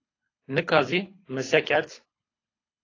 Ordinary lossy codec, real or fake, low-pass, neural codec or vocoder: AAC, 32 kbps; fake; 7.2 kHz; codec, 24 kHz, 6 kbps, HILCodec